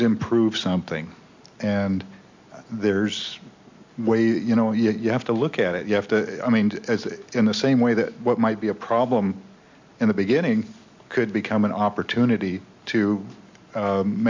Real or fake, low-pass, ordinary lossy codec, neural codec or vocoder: real; 7.2 kHz; MP3, 64 kbps; none